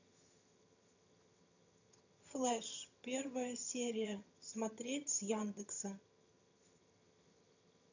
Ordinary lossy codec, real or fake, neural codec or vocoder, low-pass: none; fake; vocoder, 22.05 kHz, 80 mel bands, HiFi-GAN; 7.2 kHz